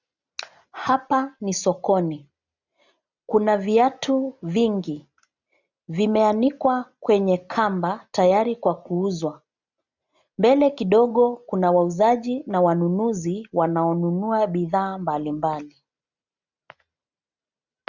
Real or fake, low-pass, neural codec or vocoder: real; 7.2 kHz; none